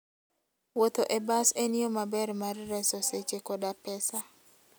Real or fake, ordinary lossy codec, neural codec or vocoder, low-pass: real; none; none; none